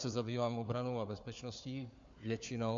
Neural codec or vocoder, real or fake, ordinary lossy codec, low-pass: codec, 16 kHz, 4 kbps, FunCodec, trained on Chinese and English, 50 frames a second; fake; AAC, 64 kbps; 7.2 kHz